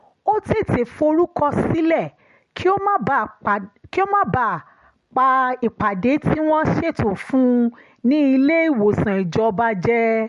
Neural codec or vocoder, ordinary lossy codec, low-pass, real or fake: none; MP3, 48 kbps; 14.4 kHz; real